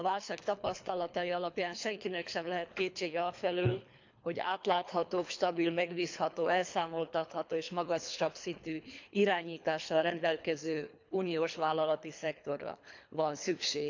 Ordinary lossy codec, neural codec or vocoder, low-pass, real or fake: MP3, 64 kbps; codec, 24 kHz, 3 kbps, HILCodec; 7.2 kHz; fake